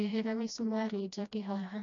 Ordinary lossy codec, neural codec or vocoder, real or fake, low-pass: none; codec, 16 kHz, 1 kbps, FreqCodec, smaller model; fake; 7.2 kHz